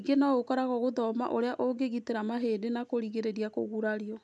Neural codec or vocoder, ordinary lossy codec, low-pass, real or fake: none; none; none; real